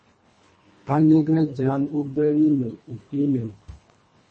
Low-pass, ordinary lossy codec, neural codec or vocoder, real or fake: 9.9 kHz; MP3, 32 kbps; codec, 24 kHz, 1.5 kbps, HILCodec; fake